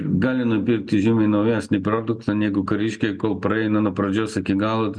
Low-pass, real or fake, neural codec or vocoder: 9.9 kHz; real; none